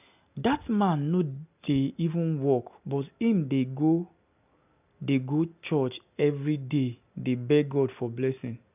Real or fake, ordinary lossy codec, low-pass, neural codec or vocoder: real; none; 3.6 kHz; none